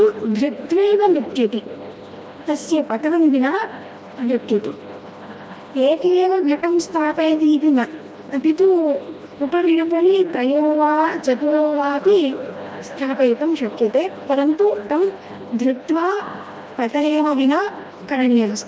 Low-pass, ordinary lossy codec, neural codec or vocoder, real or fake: none; none; codec, 16 kHz, 1 kbps, FreqCodec, smaller model; fake